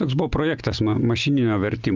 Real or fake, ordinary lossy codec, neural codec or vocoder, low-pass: real; Opus, 32 kbps; none; 7.2 kHz